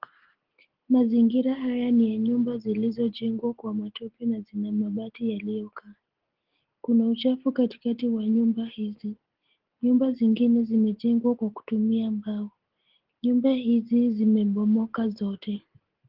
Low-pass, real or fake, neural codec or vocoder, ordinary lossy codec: 5.4 kHz; real; none; Opus, 16 kbps